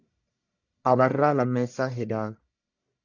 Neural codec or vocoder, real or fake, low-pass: codec, 44.1 kHz, 1.7 kbps, Pupu-Codec; fake; 7.2 kHz